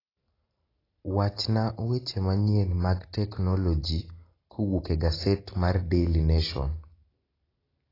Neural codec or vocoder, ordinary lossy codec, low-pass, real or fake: none; AAC, 24 kbps; 5.4 kHz; real